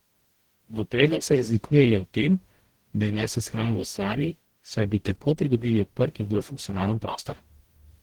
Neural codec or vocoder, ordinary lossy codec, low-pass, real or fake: codec, 44.1 kHz, 0.9 kbps, DAC; Opus, 16 kbps; 19.8 kHz; fake